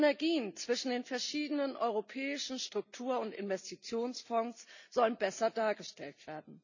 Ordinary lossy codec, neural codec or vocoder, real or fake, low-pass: none; none; real; 7.2 kHz